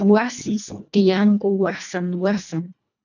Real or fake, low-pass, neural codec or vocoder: fake; 7.2 kHz; codec, 24 kHz, 1.5 kbps, HILCodec